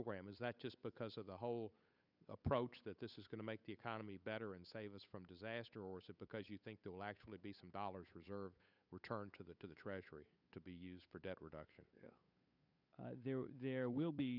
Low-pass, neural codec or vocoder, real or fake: 5.4 kHz; codec, 16 kHz, 8 kbps, FunCodec, trained on Chinese and English, 25 frames a second; fake